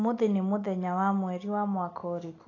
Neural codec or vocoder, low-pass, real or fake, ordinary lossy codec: none; 7.2 kHz; real; AAC, 32 kbps